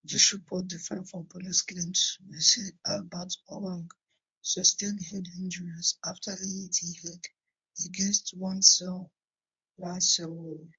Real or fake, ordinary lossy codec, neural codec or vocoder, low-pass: fake; MP3, 64 kbps; codec, 24 kHz, 0.9 kbps, WavTokenizer, medium speech release version 1; 9.9 kHz